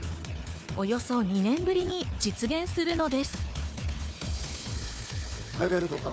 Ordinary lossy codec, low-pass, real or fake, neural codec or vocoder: none; none; fake; codec, 16 kHz, 4 kbps, FunCodec, trained on Chinese and English, 50 frames a second